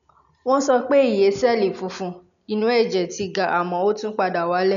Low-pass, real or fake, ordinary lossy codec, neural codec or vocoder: 7.2 kHz; real; none; none